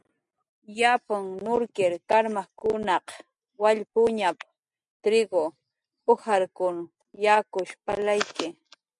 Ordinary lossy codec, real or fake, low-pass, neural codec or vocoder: MP3, 96 kbps; real; 10.8 kHz; none